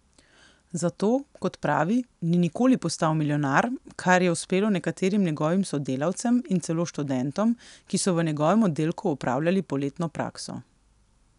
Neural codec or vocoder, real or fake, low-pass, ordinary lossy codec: none; real; 10.8 kHz; none